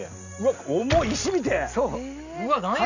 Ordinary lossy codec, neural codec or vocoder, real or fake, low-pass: none; none; real; 7.2 kHz